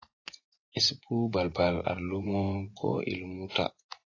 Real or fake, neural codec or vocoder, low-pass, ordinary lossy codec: fake; vocoder, 24 kHz, 100 mel bands, Vocos; 7.2 kHz; AAC, 32 kbps